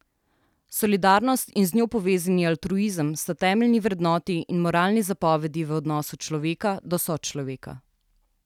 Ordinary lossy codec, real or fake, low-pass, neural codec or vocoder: none; real; 19.8 kHz; none